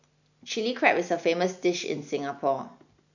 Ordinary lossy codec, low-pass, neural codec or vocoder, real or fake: none; 7.2 kHz; none; real